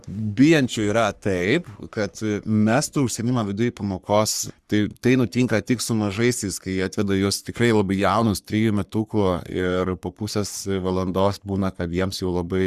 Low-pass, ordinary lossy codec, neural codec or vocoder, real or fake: 14.4 kHz; Opus, 64 kbps; codec, 44.1 kHz, 3.4 kbps, Pupu-Codec; fake